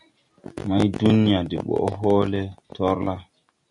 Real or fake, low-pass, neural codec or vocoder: real; 10.8 kHz; none